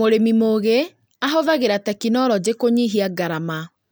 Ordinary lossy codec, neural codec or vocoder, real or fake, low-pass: none; none; real; none